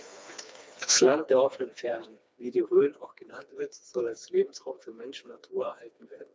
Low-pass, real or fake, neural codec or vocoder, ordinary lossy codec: none; fake; codec, 16 kHz, 2 kbps, FreqCodec, smaller model; none